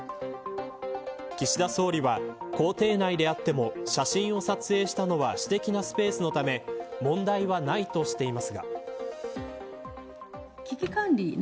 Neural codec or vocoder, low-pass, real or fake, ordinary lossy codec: none; none; real; none